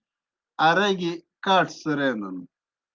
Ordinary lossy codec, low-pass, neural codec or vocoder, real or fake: Opus, 24 kbps; 7.2 kHz; none; real